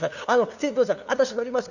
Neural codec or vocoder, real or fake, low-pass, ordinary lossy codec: codec, 16 kHz, 2 kbps, FunCodec, trained on LibriTTS, 25 frames a second; fake; 7.2 kHz; none